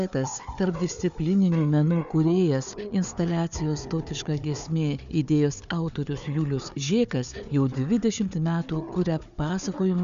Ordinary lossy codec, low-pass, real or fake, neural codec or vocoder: MP3, 96 kbps; 7.2 kHz; fake; codec, 16 kHz, 4 kbps, FunCodec, trained on Chinese and English, 50 frames a second